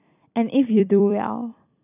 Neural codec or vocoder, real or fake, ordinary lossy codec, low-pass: vocoder, 44.1 kHz, 128 mel bands every 256 samples, BigVGAN v2; fake; none; 3.6 kHz